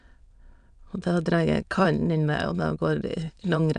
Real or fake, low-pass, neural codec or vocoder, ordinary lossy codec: fake; 9.9 kHz; autoencoder, 22.05 kHz, a latent of 192 numbers a frame, VITS, trained on many speakers; none